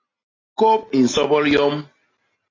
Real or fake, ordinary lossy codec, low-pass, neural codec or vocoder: real; AAC, 32 kbps; 7.2 kHz; none